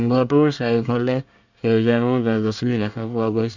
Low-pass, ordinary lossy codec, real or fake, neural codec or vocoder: 7.2 kHz; none; fake; codec, 24 kHz, 1 kbps, SNAC